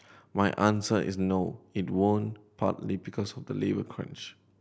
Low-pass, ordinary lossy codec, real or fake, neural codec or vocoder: none; none; real; none